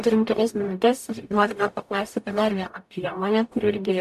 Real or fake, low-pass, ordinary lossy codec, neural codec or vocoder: fake; 14.4 kHz; AAC, 96 kbps; codec, 44.1 kHz, 0.9 kbps, DAC